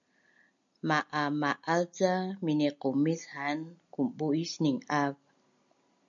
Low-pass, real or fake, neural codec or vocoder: 7.2 kHz; real; none